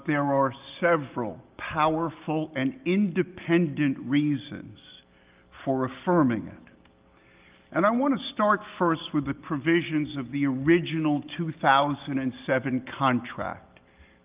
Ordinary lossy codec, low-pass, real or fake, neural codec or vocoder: Opus, 32 kbps; 3.6 kHz; real; none